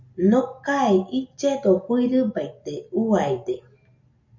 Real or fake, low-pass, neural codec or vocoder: real; 7.2 kHz; none